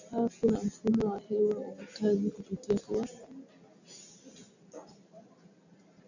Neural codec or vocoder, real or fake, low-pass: none; real; 7.2 kHz